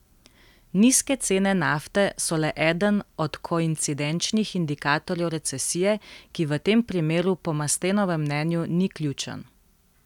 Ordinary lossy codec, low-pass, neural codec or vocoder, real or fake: none; 19.8 kHz; none; real